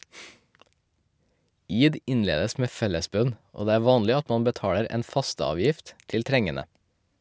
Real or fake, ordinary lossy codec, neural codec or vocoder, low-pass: real; none; none; none